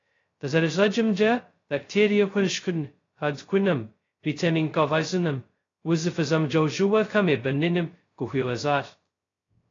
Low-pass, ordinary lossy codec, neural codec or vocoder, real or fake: 7.2 kHz; AAC, 32 kbps; codec, 16 kHz, 0.2 kbps, FocalCodec; fake